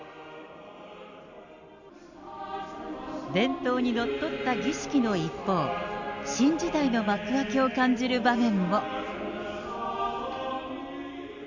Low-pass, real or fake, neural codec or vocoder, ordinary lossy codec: 7.2 kHz; real; none; none